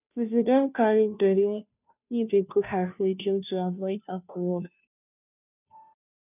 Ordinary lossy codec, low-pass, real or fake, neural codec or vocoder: none; 3.6 kHz; fake; codec, 16 kHz, 0.5 kbps, FunCodec, trained on Chinese and English, 25 frames a second